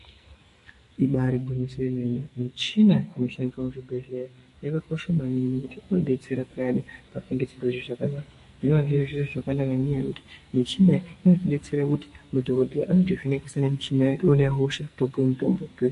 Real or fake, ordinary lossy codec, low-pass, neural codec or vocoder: fake; MP3, 48 kbps; 14.4 kHz; codec, 44.1 kHz, 2.6 kbps, SNAC